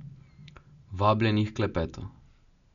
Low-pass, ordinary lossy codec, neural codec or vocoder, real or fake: 7.2 kHz; none; none; real